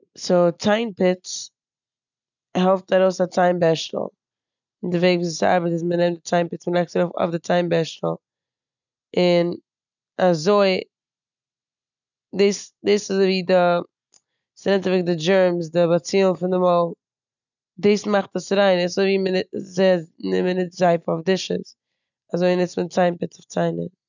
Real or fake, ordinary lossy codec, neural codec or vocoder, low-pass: real; none; none; 7.2 kHz